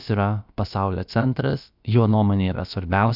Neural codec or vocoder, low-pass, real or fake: codec, 16 kHz, about 1 kbps, DyCAST, with the encoder's durations; 5.4 kHz; fake